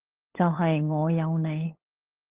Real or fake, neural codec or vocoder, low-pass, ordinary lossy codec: fake; codec, 16 kHz, 8 kbps, FunCodec, trained on LibriTTS, 25 frames a second; 3.6 kHz; Opus, 16 kbps